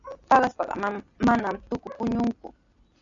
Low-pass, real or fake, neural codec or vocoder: 7.2 kHz; real; none